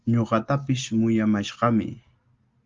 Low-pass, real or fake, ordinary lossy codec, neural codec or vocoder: 7.2 kHz; real; Opus, 32 kbps; none